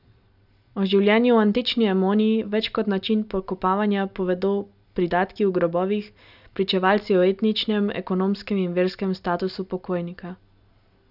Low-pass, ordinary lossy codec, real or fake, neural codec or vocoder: 5.4 kHz; none; real; none